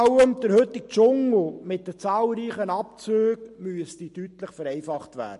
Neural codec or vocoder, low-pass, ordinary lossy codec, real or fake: none; 14.4 kHz; MP3, 48 kbps; real